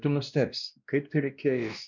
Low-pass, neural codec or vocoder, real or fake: 7.2 kHz; codec, 16 kHz, 1 kbps, X-Codec, WavLM features, trained on Multilingual LibriSpeech; fake